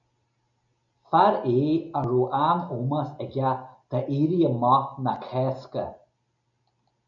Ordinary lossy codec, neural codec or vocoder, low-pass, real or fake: MP3, 96 kbps; none; 7.2 kHz; real